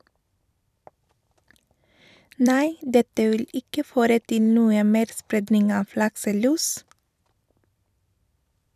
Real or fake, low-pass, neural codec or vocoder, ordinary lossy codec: fake; 14.4 kHz; vocoder, 44.1 kHz, 128 mel bands every 256 samples, BigVGAN v2; none